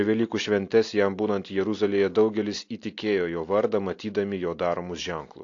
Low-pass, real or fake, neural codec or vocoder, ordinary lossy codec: 7.2 kHz; real; none; AAC, 48 kbps